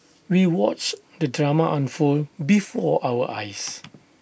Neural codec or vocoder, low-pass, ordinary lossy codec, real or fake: none; none; none; real